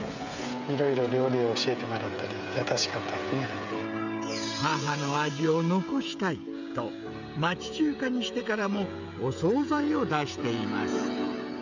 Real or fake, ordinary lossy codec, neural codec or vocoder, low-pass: fake; none; codec, 16 kHz, 16 kbps, FreqCodec, smaller model; 7.2 kHz